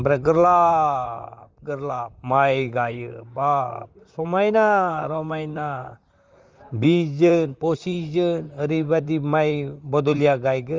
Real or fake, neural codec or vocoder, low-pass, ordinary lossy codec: fake; vocoder, 44.1 kHz, 128 mel bands, Pupu-Vocoder; 7.2 kHz; Opus, 32 kbps